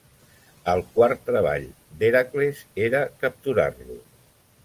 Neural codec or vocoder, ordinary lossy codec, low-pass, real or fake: none; Opus, 24 kbps; 14.4 kHz; real